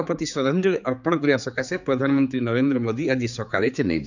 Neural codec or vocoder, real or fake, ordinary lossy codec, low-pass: codec, 16 kHz, 4 kbps, X-Codec, HuBERT features, trained on general audio; fake; none; 7.2 kHz